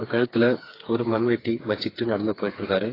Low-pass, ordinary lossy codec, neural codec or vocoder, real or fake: 5.4 kHz; AAC, 24 kbps; codec, 16 kHz, 4 kbps, FreqCodec, smaller model; fake